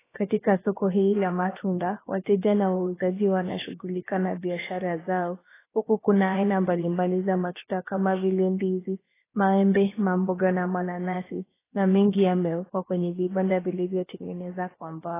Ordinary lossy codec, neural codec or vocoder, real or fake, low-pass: AAC, 16 kbps; codec, 16 kHz, about 1 kbps, DyCAST, with the encoder's durations; fake; 3.6 kHz